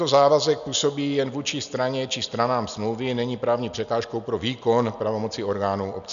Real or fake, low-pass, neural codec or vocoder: real; 7.2 kHz; none